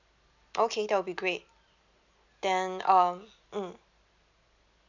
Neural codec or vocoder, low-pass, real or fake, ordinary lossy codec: none; 7.2 kHz; real; none